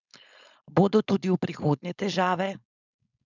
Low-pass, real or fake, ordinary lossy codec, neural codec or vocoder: 7.2 kHz; fake; none; codec, 16 kHz, 4.8 kbps, FACodec